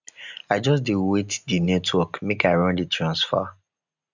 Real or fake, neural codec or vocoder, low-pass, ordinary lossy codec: real; none; 7.2 kHz; none